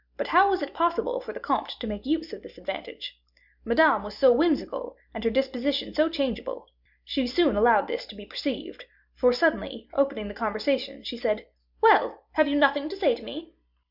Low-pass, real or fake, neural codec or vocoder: 5.4 kHz; real; none